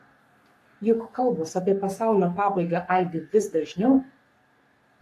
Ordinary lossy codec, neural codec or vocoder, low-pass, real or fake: AAC, 64 kbps; codec, 44.1 kHz, 3.4 kbps, Pupu-Codec; 14.4 kHz; fake